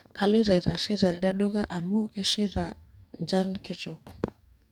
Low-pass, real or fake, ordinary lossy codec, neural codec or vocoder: 19.8 kHz; fake; none; codec, 44.1 kHz, 2.6 kbps, DAC